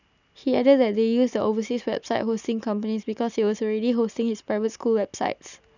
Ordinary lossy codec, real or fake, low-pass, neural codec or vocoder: none; real; 7.2 kHz; none